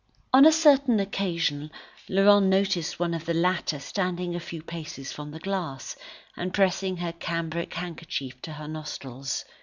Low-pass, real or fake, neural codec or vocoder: 7.2 kHz; real; none